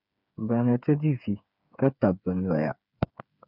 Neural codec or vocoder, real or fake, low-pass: codec, 16 kHz, 8 kbps, FreqCodec, smaller model; fake; 5.4 kHz